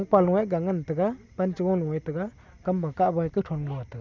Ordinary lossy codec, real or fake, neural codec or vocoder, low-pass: none; real; none; 7.2 kHz